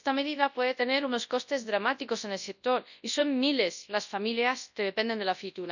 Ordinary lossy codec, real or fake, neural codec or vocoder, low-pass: none; fake; codec, 24 kHz, 0.9 kbps, WavTokenizer, large speech release; 7.2 kHz